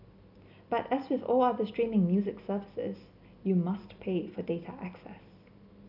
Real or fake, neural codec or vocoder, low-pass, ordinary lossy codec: real; none; 5.4 kHz; none